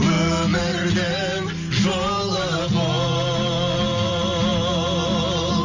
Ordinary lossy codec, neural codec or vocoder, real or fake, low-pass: AAC, 48 kbps; none; real; 7.2 kHz